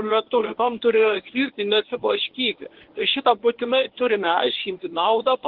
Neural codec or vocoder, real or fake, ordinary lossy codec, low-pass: codec, 24 kHz, 0.9 kbps, WavTokenizer, medium speech release version 1; fake; Opus, 16 kbps; 5.4 kHz